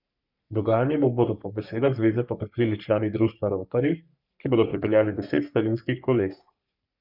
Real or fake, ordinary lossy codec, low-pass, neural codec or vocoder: fake; none; 5.4 kHz; codec, 44.1 kHz, 3.4 kbps, Pupu-Codec